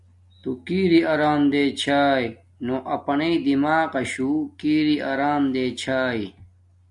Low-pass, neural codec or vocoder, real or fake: 10.8 kHz; none; real